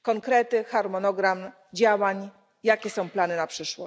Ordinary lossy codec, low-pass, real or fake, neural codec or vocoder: none; none; real; none